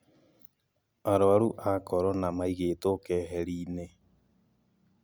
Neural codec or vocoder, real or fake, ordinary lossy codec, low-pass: none; real; none; none